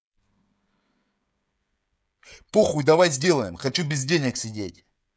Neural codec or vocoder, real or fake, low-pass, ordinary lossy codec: codec, 16 kHz, 16 kbps, FreqCodec, smaller model; fake; none; none